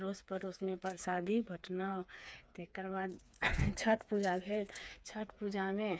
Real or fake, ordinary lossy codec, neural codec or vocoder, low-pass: fake; none; codec, 16 kHz, 4 kbps, FreqCodec, smaller model; none